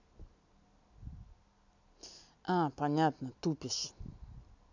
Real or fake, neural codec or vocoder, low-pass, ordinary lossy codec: real; none; 7.2 kHz; none